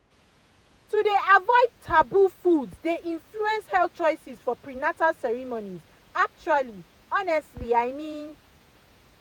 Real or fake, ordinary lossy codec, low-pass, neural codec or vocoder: fake; none; none; vocoder, 48 kHz, 128 mel bands, Vocos